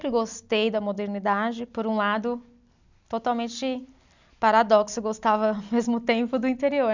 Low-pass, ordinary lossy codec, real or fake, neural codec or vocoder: 7.2 kHz; none; real; none